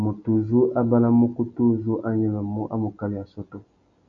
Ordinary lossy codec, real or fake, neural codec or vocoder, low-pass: AAC, 64 kbps; real; none; 7.2 kHz